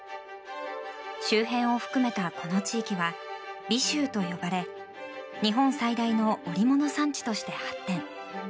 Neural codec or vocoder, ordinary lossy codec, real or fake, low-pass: none; none; real; none